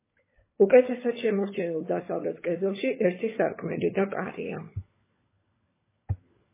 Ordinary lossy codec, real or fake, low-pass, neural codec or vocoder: MP3, 16 kbps; fake; 3.6 kHz; codec, 16 kHz, 16 kbps, FunCodec, trained on LibriTTS, 50 frames a second